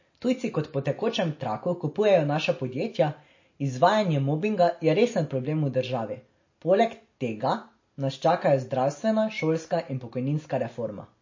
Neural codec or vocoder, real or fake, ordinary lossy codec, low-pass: none; real; MP3, 32 kbps; 7.2 kHz